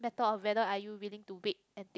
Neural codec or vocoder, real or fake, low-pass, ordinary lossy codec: none; real; none; none